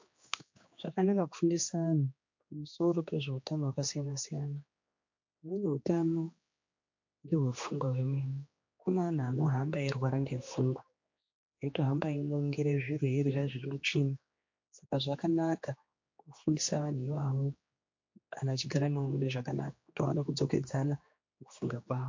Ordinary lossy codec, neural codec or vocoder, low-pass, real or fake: MP3, 48 kbps; codec, 16 kHz, 2 kbps, X-Codec, HuBERT features, trained on general audio; 7.2 kHz; fake